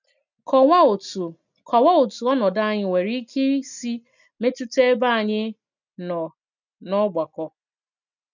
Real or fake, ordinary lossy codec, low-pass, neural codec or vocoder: real; none; 7.2 kHz; none